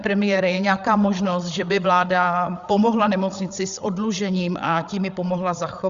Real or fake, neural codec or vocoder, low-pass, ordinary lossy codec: fake; codec, 16 kHz, 8 kbps, FreqCodec, larger model; 7.2 kHz; Opus, 64 kbps